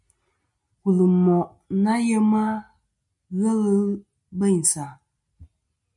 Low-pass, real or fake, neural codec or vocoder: 10.8 kHz; real; none